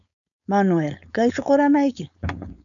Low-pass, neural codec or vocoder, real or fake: 7.2 kHz; codec, 16 kHz, 4.8 kbps, FACodec; fake